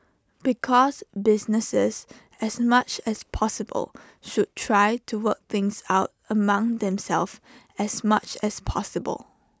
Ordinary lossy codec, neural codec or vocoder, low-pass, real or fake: none; none; none; real